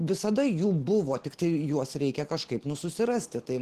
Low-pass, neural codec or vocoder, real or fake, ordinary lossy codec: 14.4 kHz; none; real; Opus, 16 kbps